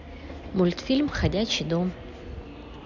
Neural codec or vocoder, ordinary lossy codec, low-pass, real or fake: none; none; 7.2 kHz; real